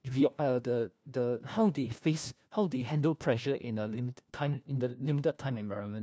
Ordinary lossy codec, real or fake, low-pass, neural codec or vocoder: none; fake; none; codec, 16 kHz, 1 kbps, FunCodec, trained on LibriTTS, 50 frames a second